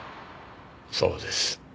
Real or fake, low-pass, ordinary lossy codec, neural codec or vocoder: real; none; none; none